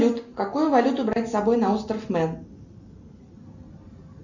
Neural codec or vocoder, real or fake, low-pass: none; real; 7.2 kHz